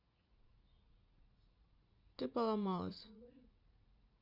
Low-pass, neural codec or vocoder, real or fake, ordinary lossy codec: 5.4 kHz; vocoder, 44.1 kHz, 80 mel bands, Vocos; fake; none